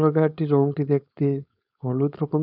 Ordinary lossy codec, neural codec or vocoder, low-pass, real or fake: none; codec, 16 kHz, 4.8 kbps, FACodec; 5.4 kHz; fake